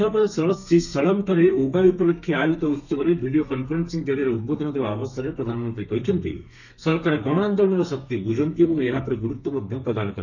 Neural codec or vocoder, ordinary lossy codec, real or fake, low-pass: codec, 32 kHz, 1.9 kbps, SNAC; none; fake; 7.2 kHz